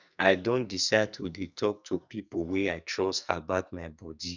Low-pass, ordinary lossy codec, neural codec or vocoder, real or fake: 7.2 kHz; none; codec, 44.1 kHz, 2.6 kbps, SNAC; fake